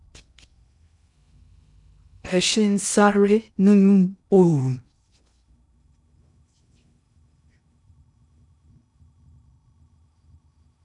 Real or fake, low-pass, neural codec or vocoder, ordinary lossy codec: fake; 10.8 kHz; codec, 16 kHz in and 24 kHz out, 0.6 kbps, FocalCodec, streaming, 2048 codes; none